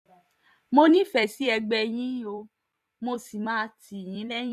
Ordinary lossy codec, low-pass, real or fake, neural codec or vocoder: none; 14.4 kHz; fake; vocoder, 44.1 kHz, 128 mel bands every 256 samples, BigVGAN v2